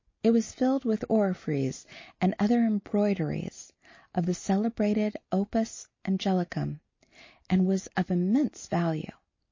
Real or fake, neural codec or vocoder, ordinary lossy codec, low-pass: real; none; MP3, 32 kbps; 7.2 kHz